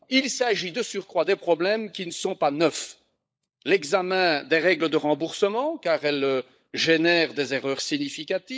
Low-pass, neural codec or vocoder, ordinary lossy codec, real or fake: none; codec, 16 kHz, 16 kbps, FunCodec, trained on LibriTTS, 50 frames a second; none; fake